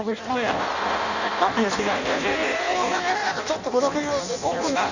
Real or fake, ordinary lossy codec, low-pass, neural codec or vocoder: fake; none; 7.2 kHz; codec, 16 kHz in and 24 kHz out, 0.6 kbps, FireRedTTS-2 codec